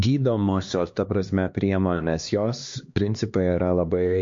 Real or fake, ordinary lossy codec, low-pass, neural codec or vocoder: fake; MP3, 48 kbps; 7.2 kHz; codec, 16 kHz, 2 kbps, X-Codec, HuBERT features, trained on LibriSpeech